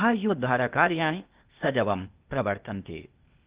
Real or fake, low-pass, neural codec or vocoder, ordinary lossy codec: fake; 3.6 kHz; codec, 16 kHz, 0.8 kbps, ZipCodec; Opus, 32 kbps